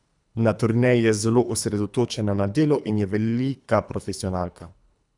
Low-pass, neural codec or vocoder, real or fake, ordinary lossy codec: 10.8 kHz; codec, 24 kHz, 3 kbps, HILCodec; fake; none